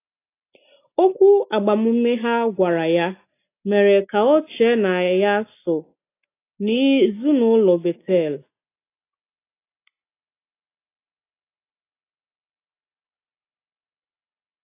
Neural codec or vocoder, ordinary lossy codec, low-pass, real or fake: none; AAC, 24 kbps; 3.6 kHz; real